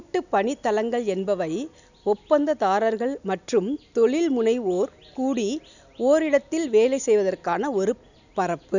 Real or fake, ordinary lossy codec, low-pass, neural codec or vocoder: real; none; 7.2 kHz; none